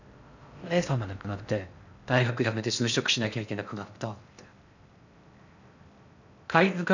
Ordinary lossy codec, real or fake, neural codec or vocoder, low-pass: none; fake; codec, 16 kHz in and 24 kHz out, 0.6 kbps, FocalCodec, streaming, 4096 codes; 7.2 kHz